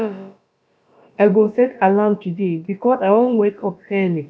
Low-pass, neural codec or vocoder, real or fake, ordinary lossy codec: none; codec, 16 kHz, about 1 kbps, DyCAST, with the encoder's durations; fake; none